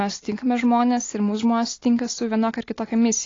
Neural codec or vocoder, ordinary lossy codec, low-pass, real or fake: none; AAC, 32 kbps; 7.2 kHz; real